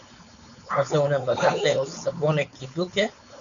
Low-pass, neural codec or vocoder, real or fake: 7.2 kHz; codec, 16 kHz, 4.8 kbps, FACodec; fake